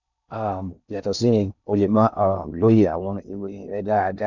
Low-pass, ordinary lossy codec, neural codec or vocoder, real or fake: 7.2 kHz; none; codec, 16 kHz in and 24 kHz out, 0.6 kbps, FocalCodec, streaming, 4096 codes; fake